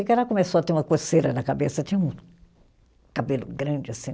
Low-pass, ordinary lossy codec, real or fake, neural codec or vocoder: none; none; real; none